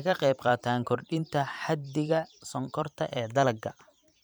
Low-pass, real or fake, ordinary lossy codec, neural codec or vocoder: none; fake; none; vocoder, 44.1 kHz, 128 mel bands every 512 samples, BigVGAN v2